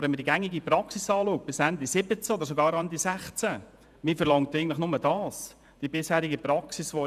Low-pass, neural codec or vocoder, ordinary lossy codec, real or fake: 14.4 kHz; vocoder, 48 kHz, 128 mel bands, Vocos; none; fake